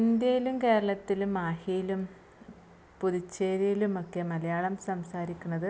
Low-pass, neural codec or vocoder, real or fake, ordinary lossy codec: none; none; real; none